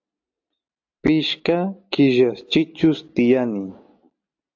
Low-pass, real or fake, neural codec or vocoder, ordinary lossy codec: 7.2 kHz; real; none; AAC, 48 kbps